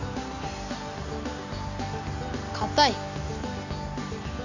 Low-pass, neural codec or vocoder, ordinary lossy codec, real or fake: 7.2 kHz; none; none; real